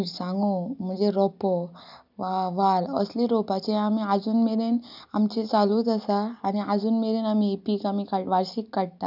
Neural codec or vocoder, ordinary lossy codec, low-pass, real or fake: none; none; 5.4 kHz; real